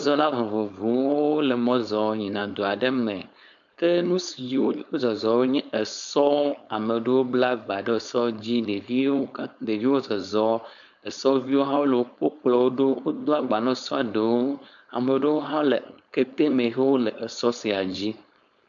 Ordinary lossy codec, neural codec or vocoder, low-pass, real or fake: MP3, 96 kbps; codec, 16 kHz, 4.8 kbps, FACodec; 7.2 kHz; fake